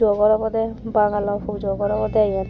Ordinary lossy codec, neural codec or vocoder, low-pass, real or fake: none; none; none; real